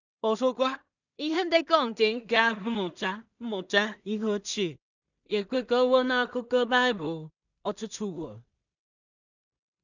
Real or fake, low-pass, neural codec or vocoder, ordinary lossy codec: fake; 7.2 kHz; codec, 16 kHz in and 24 kHz out, 0.4 kbps, LongCat-Audio-Codec, two codebook decoder; none